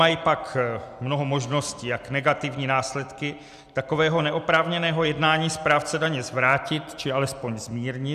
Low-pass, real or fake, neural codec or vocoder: 14.4 kHz; real; none